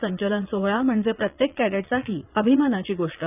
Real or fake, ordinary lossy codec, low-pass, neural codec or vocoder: fake; none; 3.6 kHz; vocoder, 22.05 kHz, 80 mel bands, Vocos